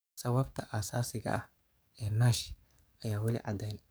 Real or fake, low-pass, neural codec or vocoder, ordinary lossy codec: fake; none; codec, 44.1 kHz, 7.8 kbps, DAC; none